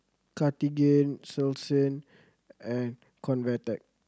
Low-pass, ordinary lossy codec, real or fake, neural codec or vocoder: none; none; real; none